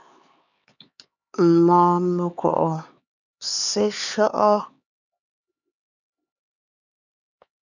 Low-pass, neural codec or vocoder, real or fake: 7.2 kHz; codec, 16 kHz, 4 kbps, X-Codec, HuBERT features, trained on LibriSpeech; fake